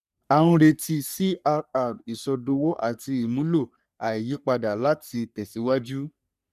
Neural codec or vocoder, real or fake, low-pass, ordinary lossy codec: codec, 44.1 kHz, 3.4 kbps, Pupu-Codec; fake; 14.4 kHz; none